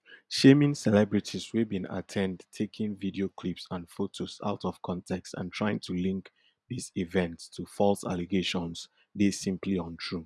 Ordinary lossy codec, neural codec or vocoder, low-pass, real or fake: none; none; none; real